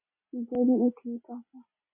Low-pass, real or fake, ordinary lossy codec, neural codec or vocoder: 3.6 kHz; real; MP3, 32 kbps; none